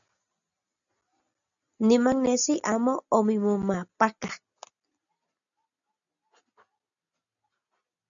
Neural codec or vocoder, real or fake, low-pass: none; real; 7.2 kHz